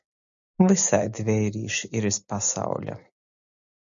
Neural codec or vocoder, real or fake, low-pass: none; real; 7.2 kHz